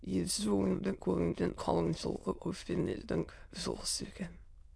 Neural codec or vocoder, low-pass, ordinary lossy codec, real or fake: autoencoder, 22.05 kHz, a latent of 192 numbers a frame, VITS, trained on many speakers; none; none; fake